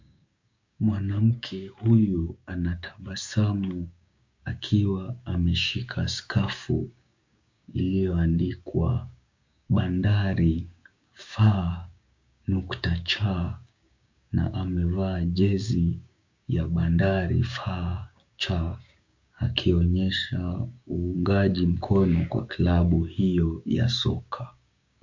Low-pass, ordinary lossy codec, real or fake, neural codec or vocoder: 7.2 kHz; MP3, 48 kbps; fake; codec, 16 kHz, 6 kbps, DAC